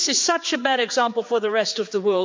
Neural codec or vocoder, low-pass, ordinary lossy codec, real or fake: codec, 16 kHz, 4 kbps, X-Codec, HuBERT features, trained on general audio; 7.2 kHz; MP3, 48 kbps; fake